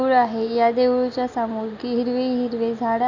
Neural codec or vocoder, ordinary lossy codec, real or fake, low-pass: none; none; real; 7.2 kHz